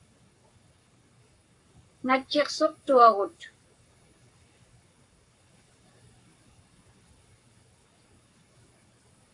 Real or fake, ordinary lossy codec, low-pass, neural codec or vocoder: fake; AAC, 64 kbps; 10.8 kHz; codec, 44.1 kHz, 7.8 kbps, Pupu-Codec